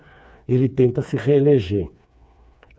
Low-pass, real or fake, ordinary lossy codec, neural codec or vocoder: none; fake; none; codec, 16 kHz, 4 kbps, FreqCodec, smaller model